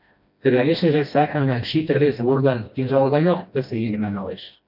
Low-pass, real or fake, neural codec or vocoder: 5.4 kHz; fake; codec, 16 kHz, 1 kbps, FreqCodec, smaller model